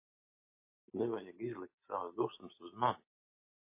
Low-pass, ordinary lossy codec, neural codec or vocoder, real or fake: 3.6 kHz; MP3, 24 kbps; none; real